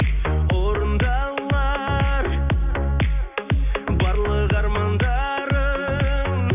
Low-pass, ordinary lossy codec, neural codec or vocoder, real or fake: 3.6 kHz; none; none; real